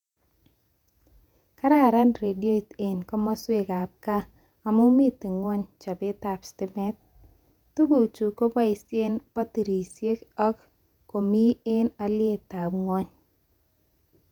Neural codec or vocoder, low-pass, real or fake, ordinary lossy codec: vocoder, 48 kHz, 128 mel bands, Vocos; 19.8 kHz; fake; none